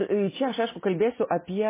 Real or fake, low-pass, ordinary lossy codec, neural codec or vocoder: real; 3.6 kHz; MP3, 16 kbps; none